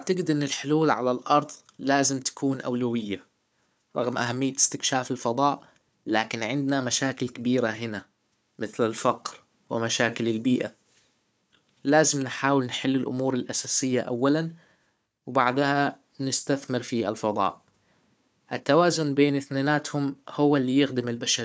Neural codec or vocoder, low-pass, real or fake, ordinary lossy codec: codec, 16 kHz, 4 kbps, FunCodec, trained on Chinese and English, 50 frames a second; none; fake; none